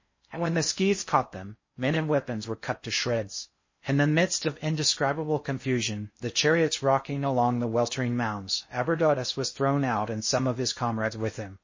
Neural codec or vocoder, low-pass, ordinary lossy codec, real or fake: codec, 16 kHz in and 24 kHz out, 0.6 kbps, FocalCodec, streaming, 4096 codes; 7.2 kHz; MP3, 32 kbps; fake